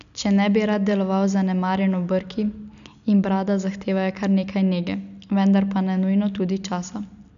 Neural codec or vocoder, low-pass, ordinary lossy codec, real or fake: none; 7.2 kHz; none; real